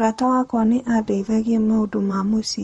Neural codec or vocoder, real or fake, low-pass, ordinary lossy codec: vocoder, 44.1 kHz, 128 mel bands, Pupu-Vocoder; fake; 19.8 kHz; MP3, 48 kbps